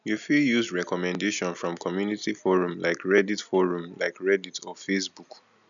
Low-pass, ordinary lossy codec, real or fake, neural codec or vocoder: 7.2 kHz; none; real; none